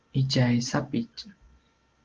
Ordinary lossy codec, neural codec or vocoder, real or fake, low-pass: Opus, 16 kbps; none; real; 7.2 kHz